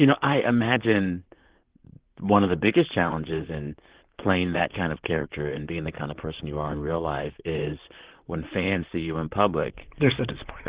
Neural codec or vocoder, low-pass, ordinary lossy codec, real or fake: vocoder, 44.1 kHz, 128 mel bands, Pupu-Vocoder; 3.6 kHz; Opus, 16 kbps; fake